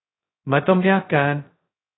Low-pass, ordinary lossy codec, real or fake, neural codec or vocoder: 7.2 kHz; AAC, 16 kbps; fake; codec, 16 kHz, 0.2 kbps, FocalCodec